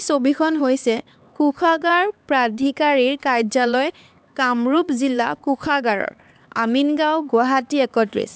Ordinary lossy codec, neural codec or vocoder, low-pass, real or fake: none; codec, 16 kHz, 4 kbps, X-Codec, HuBERT features, trained on LibriSpeech; none; fake